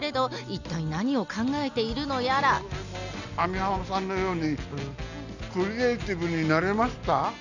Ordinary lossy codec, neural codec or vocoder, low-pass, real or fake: none; none; 7.2 kHz; real